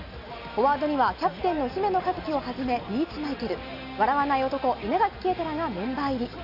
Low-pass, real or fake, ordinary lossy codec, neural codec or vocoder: 5.4 kHz; real; none; none